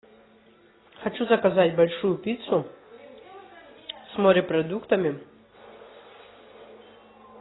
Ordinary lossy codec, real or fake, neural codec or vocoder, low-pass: AAC, 16 kbps; real; none; 7.2 kHz